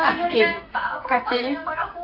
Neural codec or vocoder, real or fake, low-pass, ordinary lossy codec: codec, 44.1 kHz, 2.6 kbps, SNAC; fake; 5.4 kHz; AAC, 32 kbps